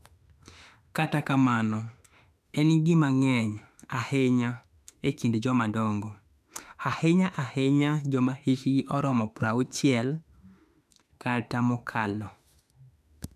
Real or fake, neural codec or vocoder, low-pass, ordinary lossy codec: fake; autoencoder, 48 kHz, 32 numbers a frame, DAC-VAE, trained on Japanese speech; 14.4 kHz; none